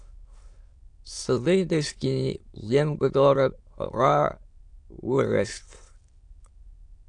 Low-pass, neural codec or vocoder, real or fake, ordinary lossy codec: 9.9 kHz; autoencoder, 22.05 kHz, a latent of 192 numbers a frame, VITS, trained on many speakers; fake; AAC, 64 kbps